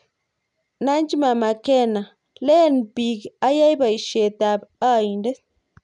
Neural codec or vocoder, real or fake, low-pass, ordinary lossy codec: none; real; 10.8 kHz; none